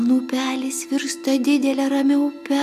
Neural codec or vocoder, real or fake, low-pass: none; real; 14.4 kHz